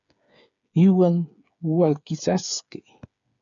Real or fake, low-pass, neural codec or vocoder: fake; 7.2 kHz; codec, 16 kHz, 8 kbps, FreqCodec, smaller model